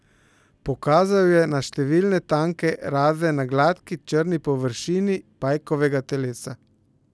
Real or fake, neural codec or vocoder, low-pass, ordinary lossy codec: real; none; none; none